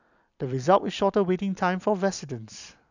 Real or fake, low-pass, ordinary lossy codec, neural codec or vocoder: real; 7.2 kHz; none; none